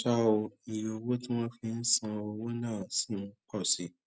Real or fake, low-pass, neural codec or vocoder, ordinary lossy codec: real; none; none; none